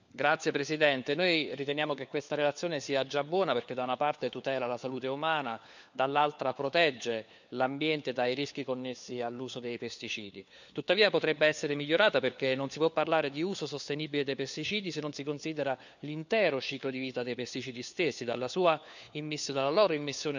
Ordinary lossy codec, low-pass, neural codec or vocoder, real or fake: none; 7.2 kHz; codec, 16 kHz, 4 kbps, FunCodec, trained on LibriTTS, 50 frames a second; fake